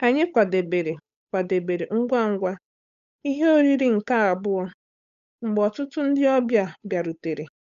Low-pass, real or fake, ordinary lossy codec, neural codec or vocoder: 7.2 kHz; fake; none; codec, 16 kHz, 8 kbps, FunCodec, trained on Chinese and English, 25 frames a second